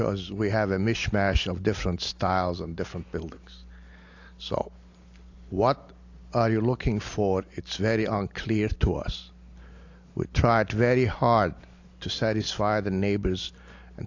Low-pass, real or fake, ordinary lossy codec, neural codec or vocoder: 7.2 kHz; real; AAC, 48 kbps; none